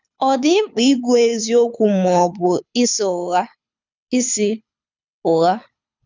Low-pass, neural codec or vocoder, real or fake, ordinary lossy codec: 7.2 kHz; codec, 24 kHz, 6 kbps, HILCodec; fake; none